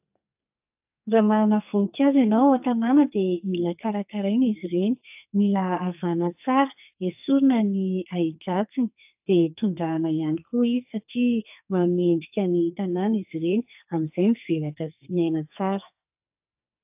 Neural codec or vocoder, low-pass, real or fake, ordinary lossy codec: codec, 44.1 kHz, 2.6 kbps, SNAC; 3.6 kHz; fake; AAC, 32 kbps